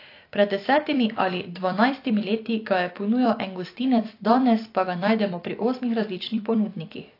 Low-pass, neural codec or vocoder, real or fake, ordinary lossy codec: 5.4 kHz; vocoder, 44.1 kHz, 128 mel bands every 256 samples, BigVGAN v2; fake; AAC, 32 kbps